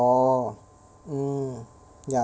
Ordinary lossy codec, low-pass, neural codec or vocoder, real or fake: none; none; none; real